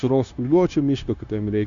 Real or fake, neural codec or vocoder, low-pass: fake; codec, 16 kHz, 0.9 kbps, LongCat-Audio-Codec; 7.2 kHz